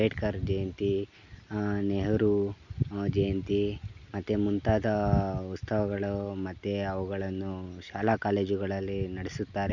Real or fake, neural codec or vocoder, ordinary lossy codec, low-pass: real; none; none; 7.2 kHz